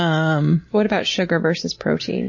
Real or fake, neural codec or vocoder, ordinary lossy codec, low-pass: real; none; MP3, 32 kbps; 7.2 kHz